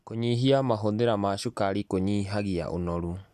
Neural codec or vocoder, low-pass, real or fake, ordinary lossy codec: none; 14.4 kHz; real; none